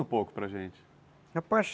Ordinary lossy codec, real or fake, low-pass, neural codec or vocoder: none; real; none; none